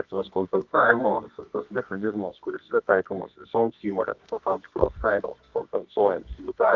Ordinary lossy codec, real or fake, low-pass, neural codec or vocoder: Opus, 24 kbps; fake; 7.2 kHz; codec, 24 kHz, 0.9 kbps, WavTokenizer, medium music audio release